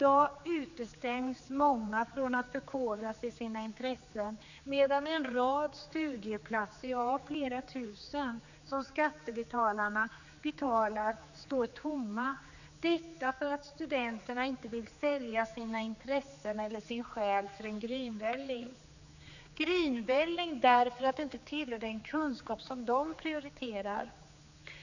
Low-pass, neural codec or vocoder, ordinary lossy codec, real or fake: 7.2 kHz; codec, 16 kHz, 4 kbps, X-Codec, HuBERT features, trained on general audio; none; fake